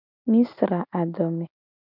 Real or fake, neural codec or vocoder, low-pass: real; none; 5.4 kHz